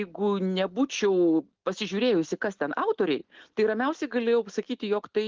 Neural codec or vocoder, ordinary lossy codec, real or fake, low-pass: none; Opus, 16 kbps; real; 7.2 kHz